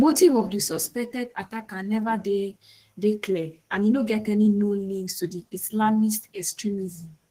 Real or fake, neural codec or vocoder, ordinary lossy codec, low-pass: fake; codec, 32 kHz, 1.9 kbps, SNAC; Opus, 16 kbps; 14.4 kHz